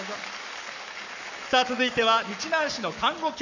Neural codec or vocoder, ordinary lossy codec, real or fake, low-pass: codec, 44.1 kHz, 7.8 kbps, Pupu-Codec; none; fake; 7.2 kHz